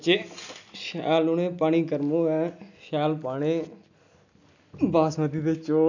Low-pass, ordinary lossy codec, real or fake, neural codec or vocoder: 7.2 kHz; none; real; none